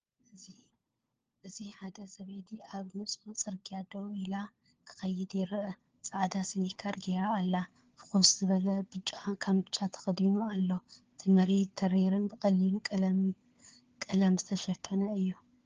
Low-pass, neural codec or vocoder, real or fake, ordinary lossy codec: 7.2 kHz; codec, 16 kHz, 4 kbps, FunCodec, trained on LibriTTS, 50 frames a second; fake; Opus, 16 kbps